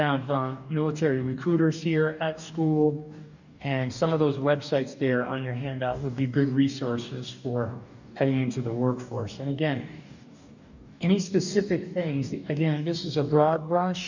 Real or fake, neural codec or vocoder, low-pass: fake; codec, 44.1 kHz, 2.6 kbps, DAC; 7.2 kHz